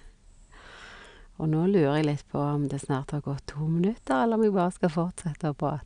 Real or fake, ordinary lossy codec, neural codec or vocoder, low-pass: real; none; none; 9.9 kHz